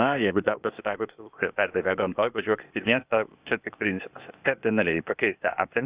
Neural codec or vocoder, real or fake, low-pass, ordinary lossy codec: codec, 16 kHz, 0.8 kbps, ZipCodec; fake; 3.6 kHz; Opus, 64 kbps